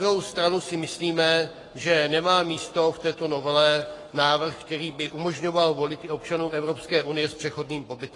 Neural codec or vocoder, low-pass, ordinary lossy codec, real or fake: codec, 44.1 kHz, 7.8 kbps, Pupu-Codec; 10.8 kHz; AAC, 32 kbps; fake